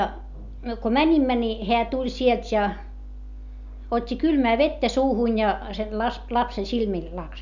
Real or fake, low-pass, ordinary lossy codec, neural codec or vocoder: real; 7.2 kHz; none; none